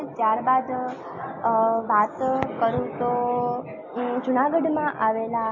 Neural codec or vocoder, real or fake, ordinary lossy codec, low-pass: none; real; MP3, 32 kbps; 7.2 kHz